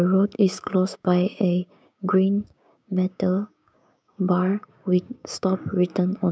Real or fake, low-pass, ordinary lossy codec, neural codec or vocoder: fake; none; none; codec, 16 kHz, 6 kbps, DAC